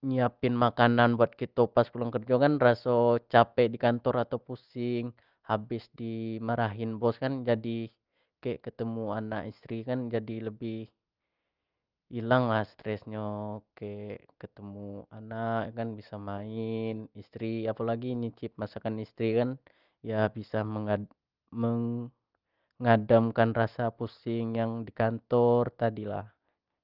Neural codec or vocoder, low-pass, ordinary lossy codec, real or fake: none; 5.4 kHz; Opus, 32 kbps; real